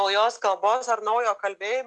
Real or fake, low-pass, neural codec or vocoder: real; 10.8 kHz; none